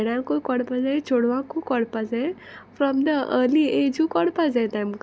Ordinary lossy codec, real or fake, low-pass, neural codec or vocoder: none; real; none; none